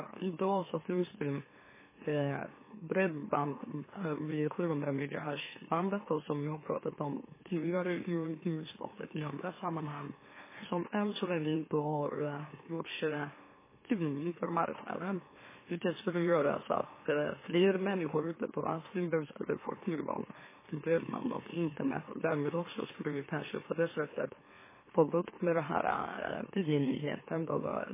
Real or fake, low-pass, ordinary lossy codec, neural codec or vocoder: fake; 3.6 kHz; MP3, 16 kbps; autoencoder, 44.1 kHz, a latent of 192 numbers a frame, MeloTTS